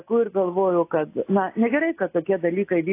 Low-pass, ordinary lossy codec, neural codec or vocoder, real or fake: 3.6 kHz; AAC, 24 kbps; none; real